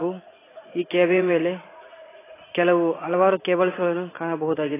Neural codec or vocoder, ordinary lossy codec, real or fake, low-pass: vocoder, 44.1 kHz, 80 mel bands, Vocos; AAC, 16 kbps; fake; 3.6 kHz